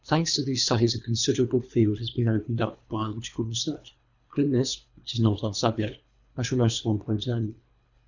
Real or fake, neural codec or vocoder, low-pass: fake; codec, 24 kHz, 3 kbps, HILCodec; 7.2 kHz